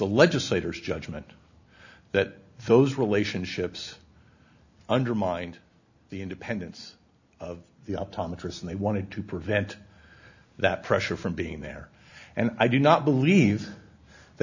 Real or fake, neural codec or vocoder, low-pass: real; none; 7.2 kHz